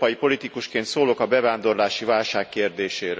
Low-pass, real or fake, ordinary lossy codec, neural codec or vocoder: none; real; none; none